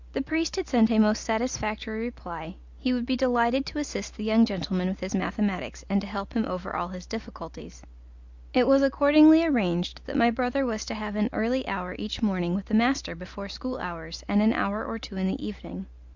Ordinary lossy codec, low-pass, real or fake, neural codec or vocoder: Opus, 64 kbps; 7.2 kHz; real; none